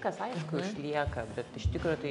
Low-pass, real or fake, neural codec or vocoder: 10.8 kHz; real; none